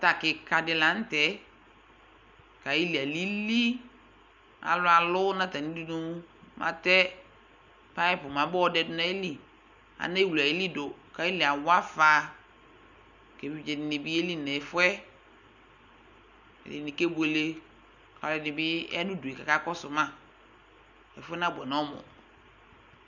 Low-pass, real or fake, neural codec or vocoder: 7.2 kHz; real; none